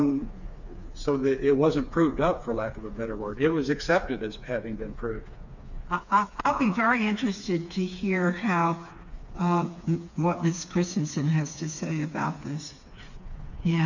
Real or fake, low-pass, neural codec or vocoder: fake; 7.2 kHz; codec, 16 kHz, 4 kbps, FreqCodec, smaller model